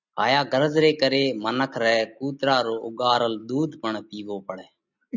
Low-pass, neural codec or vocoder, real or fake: 7.2 kHz; none; real